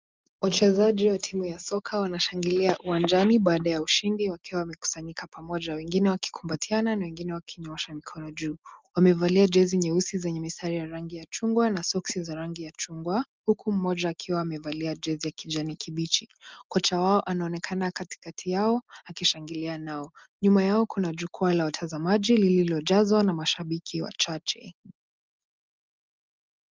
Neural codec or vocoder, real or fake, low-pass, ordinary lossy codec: none; real; 7.2 kHz; Opus, 32 kbps